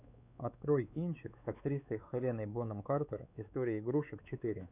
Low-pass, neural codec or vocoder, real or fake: 3.6 kHz; codec, 16 kHz, 4 kbps, X-Codec, WavLM features, trained on Multilingual LibriSpeech; fake